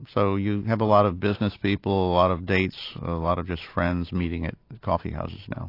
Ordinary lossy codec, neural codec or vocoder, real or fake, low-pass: AAC, 32 kbps; none; real; 5.4 kHz